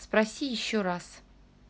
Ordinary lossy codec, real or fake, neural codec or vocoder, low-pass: none; real; none; none